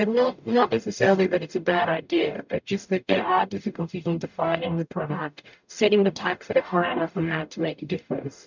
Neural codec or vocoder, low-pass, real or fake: codec, 44.1 kHz, 0.9 kbps, DAC; 7.2 kHz; fake